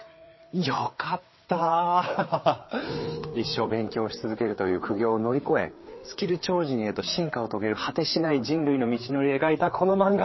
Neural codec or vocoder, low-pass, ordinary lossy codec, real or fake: codec, 16 kHz, 4 kbps, FreqCodec, larger model; 7.2 kHz; MP3, 24 kbps; fake